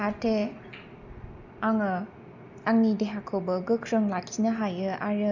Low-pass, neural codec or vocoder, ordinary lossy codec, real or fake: 7.2 kHz; none; none; real